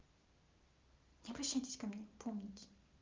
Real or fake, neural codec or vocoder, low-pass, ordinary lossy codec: real; none; 7.2 kHz; Opus, 24 kbps